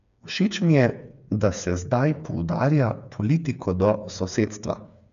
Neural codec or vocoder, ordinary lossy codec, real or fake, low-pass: codec, 16 kHz, 4 kbps, FreqCodec, smaller model; none; fake; 7.2 kHz